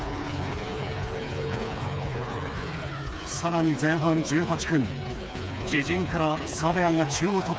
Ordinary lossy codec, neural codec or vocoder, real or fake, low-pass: none; codec, 16 kHz, 4 kbps, FreqCodec, smaller model; fake; none